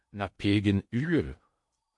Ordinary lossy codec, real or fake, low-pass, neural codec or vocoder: MP3, 48 kbps; fake; 10.8 kHz; codec, 16 kHz in and 24 kHz out, 0.6 kbps, FocalCodec, streaming, 2048 codes